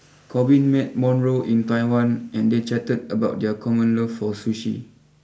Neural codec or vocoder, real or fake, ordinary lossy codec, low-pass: none; real; none; none